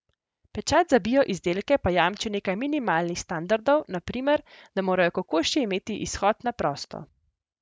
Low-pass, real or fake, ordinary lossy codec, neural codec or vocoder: none; real; none; none